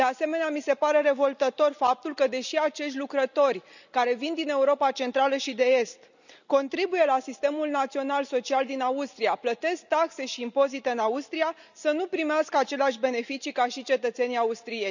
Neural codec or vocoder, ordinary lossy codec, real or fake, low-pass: none; none; real; 7.2 kHz